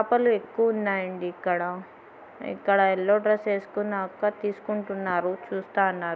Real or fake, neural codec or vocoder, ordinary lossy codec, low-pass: real; none; none; none